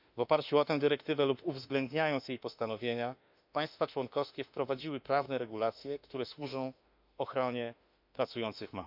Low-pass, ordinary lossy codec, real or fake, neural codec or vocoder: 5.4 kHz; none; fake; autoencoder, 48 kHz, 32 numbers a frame, DAC-VAE, trained on Japanese speech